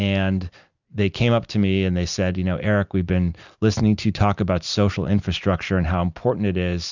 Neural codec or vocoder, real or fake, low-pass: none; real; 7.2 kHz